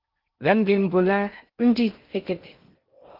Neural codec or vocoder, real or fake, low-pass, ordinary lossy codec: codec, 16 kHz in and 24 kHz out, 0.6 kbps, FocalCodec, streaming, 2048 codes; fake; 5.4 kHz; Opus, 32 kbps